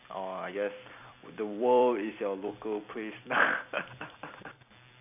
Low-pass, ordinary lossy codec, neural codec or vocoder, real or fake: 3.6 kHz; none; vocoder, 44.1 kHz, 128 mel bands every 256 samples, BigVGAN v2; fake